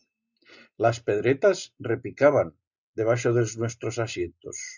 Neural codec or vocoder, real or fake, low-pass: none; real; 7.2 kHz